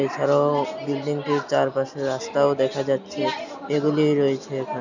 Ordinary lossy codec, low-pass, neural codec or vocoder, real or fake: none; 7.2 kHz; none; real